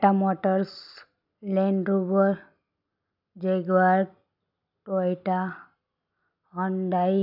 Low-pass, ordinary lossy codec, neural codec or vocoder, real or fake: 5.4 kHz; none; none; real